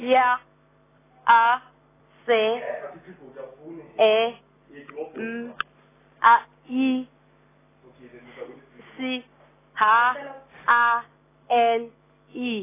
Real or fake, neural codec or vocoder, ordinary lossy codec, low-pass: real; none; AAC, 16 kbps; 3.6 kHz